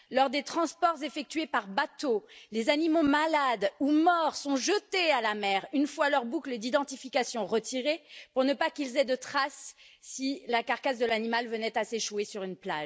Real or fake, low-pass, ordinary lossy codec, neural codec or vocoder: real; none; none; none